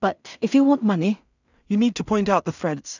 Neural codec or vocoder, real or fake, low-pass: codec, 16 kHz in and 24 kHz out, 0.4 kbps, LongCat-Audio-Codec, two codebook decoder; fake; 7.2 kHz